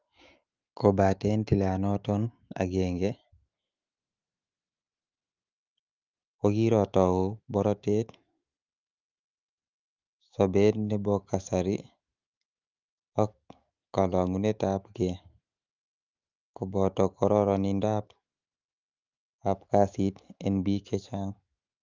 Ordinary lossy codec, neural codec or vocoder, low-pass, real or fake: Opus, 32 kbps; autoencoder, 48 kHz, 128 numbers a frame, DAC-VAE, trained on Japanese speech; 7.2 kHz; fake